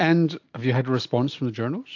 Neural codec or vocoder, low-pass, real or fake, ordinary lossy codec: none; 7.2 kHz; real; AAC, 48 kbps